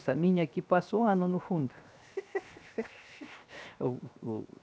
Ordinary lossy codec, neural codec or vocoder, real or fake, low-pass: none; codec, 16 kHz, 0.7 kbps, FocalCodec; fake; none